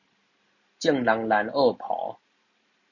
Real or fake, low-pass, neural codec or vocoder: real; 7.2 kHz; none